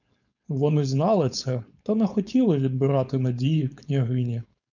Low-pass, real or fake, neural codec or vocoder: 7.2 kHz; fake; codec, 16 kHz, 4.8 kbps, FACodec